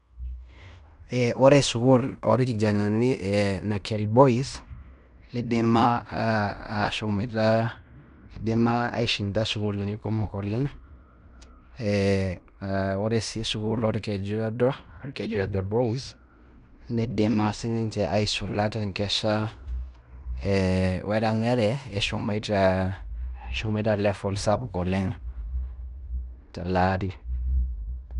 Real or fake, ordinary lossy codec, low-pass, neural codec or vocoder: fake; none; 10.8 kHz; codec, 16 kHz in and 24 kHz out, 0.9 kbps, LongCat-Audio-Codec, fine tuned four codebook decoder